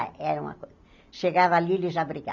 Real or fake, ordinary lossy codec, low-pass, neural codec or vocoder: real; none; 7.2 kHz; none